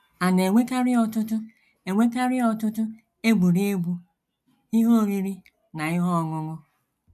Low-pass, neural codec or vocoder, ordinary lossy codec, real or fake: 14.4 kHz; none; none; real